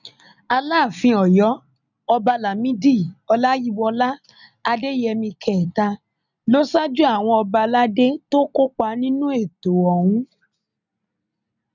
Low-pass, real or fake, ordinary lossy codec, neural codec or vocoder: 7.2 kHz; real; none; none